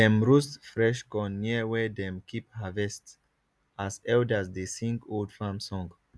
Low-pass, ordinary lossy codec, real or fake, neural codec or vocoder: none; none; real; none